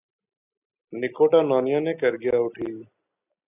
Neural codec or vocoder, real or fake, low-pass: none; real; 3.6 kHz